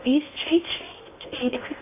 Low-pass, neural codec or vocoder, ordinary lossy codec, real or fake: 3.6 kHz; codec, 16 kHz in and 24 kHz out, 0.6 kbps, FocalCodec, streaming, 2048 codes; AAC, 24 kbps; fake